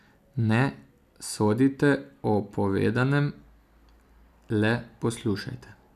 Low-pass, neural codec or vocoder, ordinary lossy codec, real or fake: 14.4 kHz; none; none; real